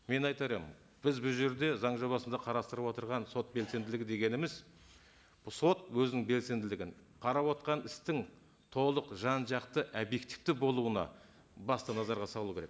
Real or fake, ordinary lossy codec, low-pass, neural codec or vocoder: real; none; none; none